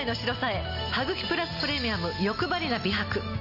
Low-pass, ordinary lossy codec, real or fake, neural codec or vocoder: 5.4 kHz; none; real; none